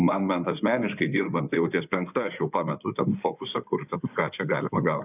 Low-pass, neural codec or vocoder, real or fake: 3.6 kHz; codec, 16 kHz in and 24 kHz out, 2.2 kbps, FireRedTTS-2 codec; fake